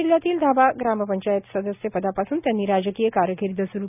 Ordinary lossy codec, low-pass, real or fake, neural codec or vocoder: none; 3.6 kHz; real; none